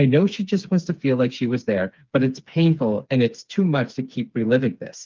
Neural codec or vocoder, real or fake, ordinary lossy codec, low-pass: codec, 16 kHz, 4 kbps, FreqCodec, smaller model; fake; Opus, 32 kbps; 7.2 kHz